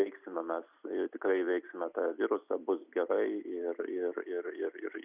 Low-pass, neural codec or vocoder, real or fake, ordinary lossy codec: 3.6 kHz; none; real; Opus, 64 kbps